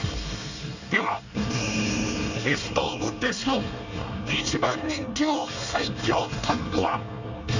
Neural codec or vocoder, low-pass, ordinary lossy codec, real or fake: codec, 24 kHz, 1 kbps, SNAC; 7.2 kHz; Opus, 64 kbps; fake